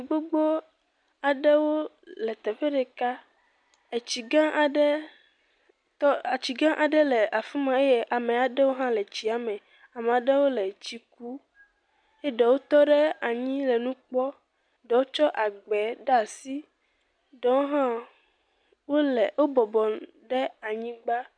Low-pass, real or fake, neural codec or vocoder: 9.9 kHz; real; none